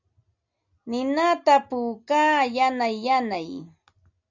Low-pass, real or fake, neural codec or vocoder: 7.2 kHz; real; none